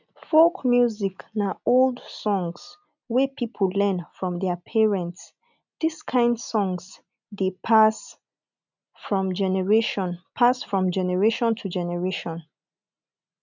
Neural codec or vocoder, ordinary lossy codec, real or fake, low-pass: none; none; real; 7.2 kHz